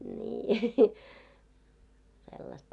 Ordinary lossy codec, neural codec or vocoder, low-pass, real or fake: none; none; 10.8 kHz; real